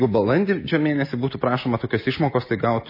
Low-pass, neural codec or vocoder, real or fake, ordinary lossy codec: 5.4 kHz; none; real; MP3, 24 kbps